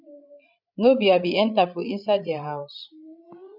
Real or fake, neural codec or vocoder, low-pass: fake; codec, 16 kHz, 16 kbps, FreqCodec, larger model; 5.4 kHz